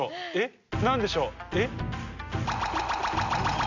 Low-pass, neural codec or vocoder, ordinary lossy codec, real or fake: 7.2 kHz; none; none; real